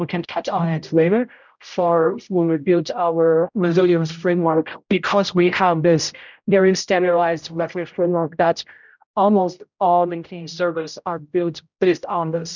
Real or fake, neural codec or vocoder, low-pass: fake; codec, 16 kHz, 0.5 kbps, X-Codec, HuBERT features, trained on general audio; 7.2 kHz